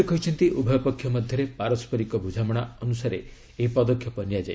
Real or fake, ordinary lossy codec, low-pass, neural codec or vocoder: real; none; none; none